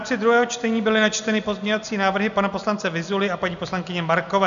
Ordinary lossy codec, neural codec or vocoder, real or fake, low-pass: AAC, 96 kbps; none; real; 7.2 kHz